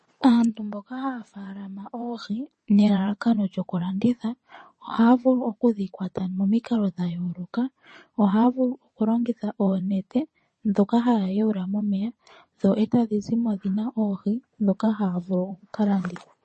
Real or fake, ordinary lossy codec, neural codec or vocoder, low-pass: fake; MP3, 32 kbps; vocoder, 22.05 kHz, 80 mel bands, WaveNeXt; 9.9 kHz